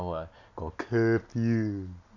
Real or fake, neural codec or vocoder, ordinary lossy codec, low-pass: real; none; none; 7.2 kHz